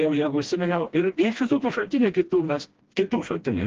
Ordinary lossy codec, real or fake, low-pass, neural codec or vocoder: Opus, 16 kbps; fake; 7.2 kHz; codec, 16 kHz, 1 kbps, FreqCodec, smaller model